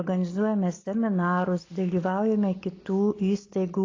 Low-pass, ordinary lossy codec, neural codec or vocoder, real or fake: 7.2 kHz; AAC, 32 kbps; none; real